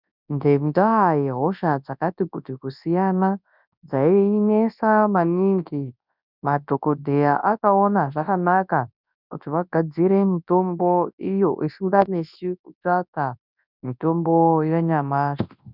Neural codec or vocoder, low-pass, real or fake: codec, 24 kHz, 0.9 kbps, WavTokenizer, large speech release; 5.4 kHz; fake